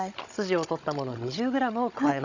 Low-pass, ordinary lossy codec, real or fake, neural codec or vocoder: 7.2 kHz; none; fake; codec, 16 kHz, 16 kbps, FunCodec, trained on Chinese and English, 50 frames a second